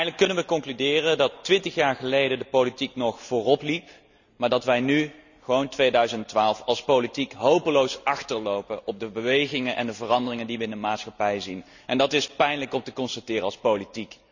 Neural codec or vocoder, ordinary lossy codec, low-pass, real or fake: none; none; 7.2 kHz; real